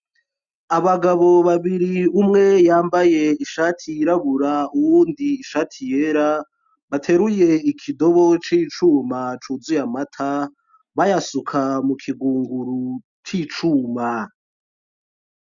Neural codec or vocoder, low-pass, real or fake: none; 7.2 kHz; real